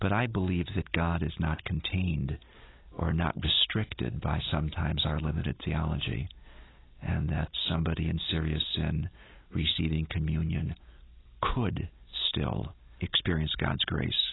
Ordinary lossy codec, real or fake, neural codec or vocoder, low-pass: AAC, 16 kbps; real; none; 7.2 kHz